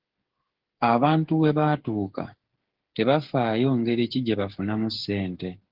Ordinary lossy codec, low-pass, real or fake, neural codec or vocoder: Opus, 16 kbps; 5.4 kHz; fake; codec, 16 kHz, 8 kbps, FreqCodec, smaller model